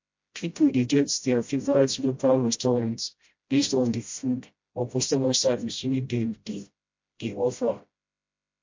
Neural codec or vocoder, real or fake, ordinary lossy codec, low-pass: codec, 16 kHz, 0.5 kbps, FreqCodec, smaller model; fake; MP3, 48 kbps; 7.2 kHz